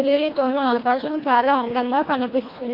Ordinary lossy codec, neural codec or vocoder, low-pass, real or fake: MP3, 32 kbps; codec, 24 kHz, 1.5 kbps, HILCodec; 5.4 kHz; fake